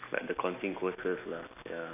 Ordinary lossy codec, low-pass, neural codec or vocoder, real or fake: AAC, 32 kbps; 3.6 kHz; none; real